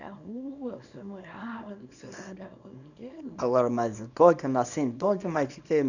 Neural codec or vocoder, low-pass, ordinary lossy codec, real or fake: codec, 24 kHz, 0.9 kbps, WavTokenizer, small release; 7.2 kHz; none; fake